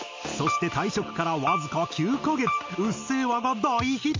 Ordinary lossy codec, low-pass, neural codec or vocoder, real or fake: MP3, 48 kbps; 7.2 kHz; none; real